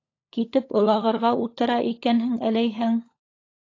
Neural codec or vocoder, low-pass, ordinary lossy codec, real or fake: codec, 16 kHz, 16 kbps, FunCodec, trained on LibriTTS, 50 frames a second; 7.2 kHz; AAC, 32 kbps; fake